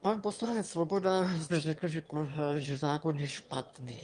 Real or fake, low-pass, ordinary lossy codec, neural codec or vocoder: fake; 9.9 kHz; Opus, 32 kbps; autoencoder, 22.05 kHz, a latent of 192 numbers a frame, VITS, trained on one speaker